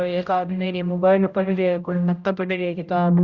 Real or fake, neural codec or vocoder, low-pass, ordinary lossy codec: fake; codec, 16 kHz, 0.5 kbps, X-Codec, HuBERT features, trained on general audio; 7.2 kHz; Opus, 64 kbps